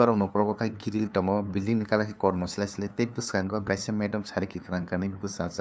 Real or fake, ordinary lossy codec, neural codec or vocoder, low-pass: fake; none; codec, 16 kHz, 2 kbps, FunCodec, trained on LibriTTS, 25 frames a second; none